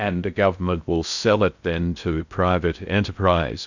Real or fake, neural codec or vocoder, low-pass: fake; codec, 16 kHz in and 24 kHz out, 0.6 kbps, FocalCodec, streaming, 4096 codes; 7.2 kHz